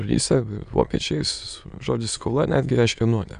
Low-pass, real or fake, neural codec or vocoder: 9.9 kHz; fake; autoencoder, 22.05 kHz, a latent of 192 numbers a frame, VITS, trained on many speakers